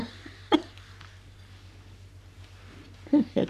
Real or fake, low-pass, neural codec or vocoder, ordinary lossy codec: fake; 14.4 kHz; codec, 44.1 kHz, 3.4 kbps, Pupu-Codec; none